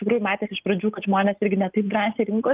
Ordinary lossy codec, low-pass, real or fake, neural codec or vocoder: Opus, 32 kbps; 3.6 kHz; real; none